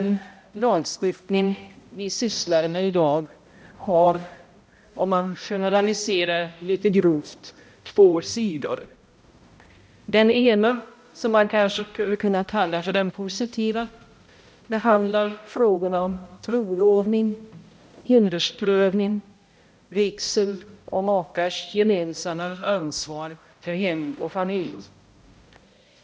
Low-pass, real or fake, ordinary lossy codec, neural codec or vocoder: none; fake; none; codec, 16 kHz, 0.5 kbps, X-Codec, HuBERT features, trained on balanced general audio